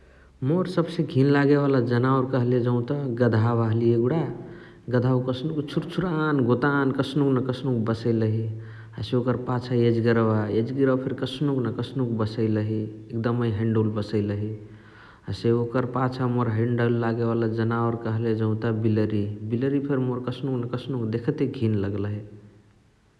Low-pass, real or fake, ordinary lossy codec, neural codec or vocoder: none; real; none; none